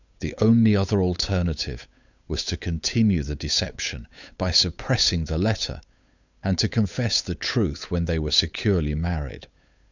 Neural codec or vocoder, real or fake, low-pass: codec, 16 kHz, 8 kbps, FunCodec, trained on Chinese and English, 25 frames a second; fake; 7.2 kHz